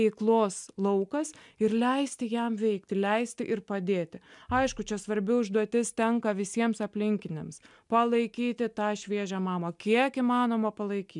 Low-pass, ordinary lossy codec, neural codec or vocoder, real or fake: 10.8 kHz; MP3, 96 kbps; none; real